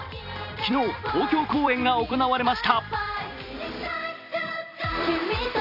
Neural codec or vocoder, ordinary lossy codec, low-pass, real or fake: vocoder, 44.1 kHz, 128 mel bands every 256 samples, BigVGAN v2; none; 5.4 kHz; fake